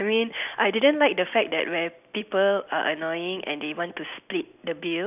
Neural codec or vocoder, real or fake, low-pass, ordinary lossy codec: none; real; 3.6 kHz; none